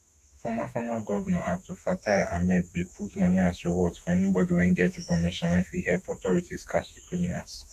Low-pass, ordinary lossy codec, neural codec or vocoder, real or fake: 14.4 kHz; none; autoencoder, 48 kHz, 32 numbers a frame, DAC-VAE, trained on Japanese speech; fake